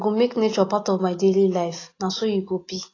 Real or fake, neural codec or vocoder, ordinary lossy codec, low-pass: real; none; AAC, 32 kbps; 7.2 kHz